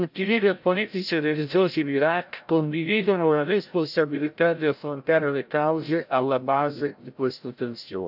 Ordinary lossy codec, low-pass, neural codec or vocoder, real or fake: AAC, 48 kbps; 5.4 kHz; codec, 16 kHz, 0.5 kbps, FreqCodec, larger model; fake